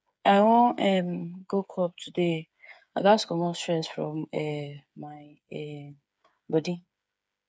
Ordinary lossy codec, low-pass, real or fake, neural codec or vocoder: none; none; fake; codec, 16 kHz, 8 kbps, FreqCodec, smaller model